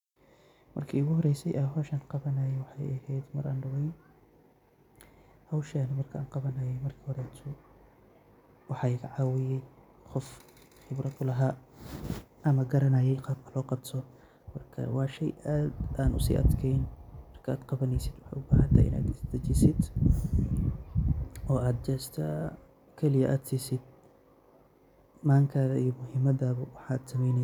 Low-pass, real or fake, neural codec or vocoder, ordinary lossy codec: 19.8 kHz; real; none; none